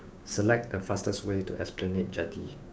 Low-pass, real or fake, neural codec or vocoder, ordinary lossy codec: none; fake; codec, 16 kHz, 6 kbps, DAC; none